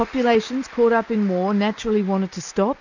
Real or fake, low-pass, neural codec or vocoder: real; 7.2 kHz; none